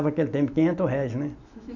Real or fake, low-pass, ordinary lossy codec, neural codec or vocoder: real; 7.2 kHz; none; none